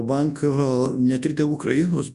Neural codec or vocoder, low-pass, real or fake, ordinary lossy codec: codec, 24 kHz, 0.9 kbps, WavTokenizer, large speech release; 10.8 kHz; fake; AAC, 96 kbps